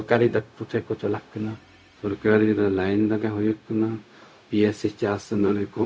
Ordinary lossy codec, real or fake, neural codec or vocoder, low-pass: none; fake; codec, 16 kHz, 0.4 kbps, LongCat-Audio-Codec; none